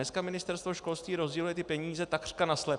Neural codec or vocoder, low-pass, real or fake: none; 10.8 kHz; real